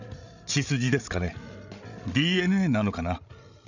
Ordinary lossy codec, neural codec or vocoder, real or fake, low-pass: none; codec, 16 kHz, 16 kbps, FreqCodec, larger model; fake; 7.2 kHz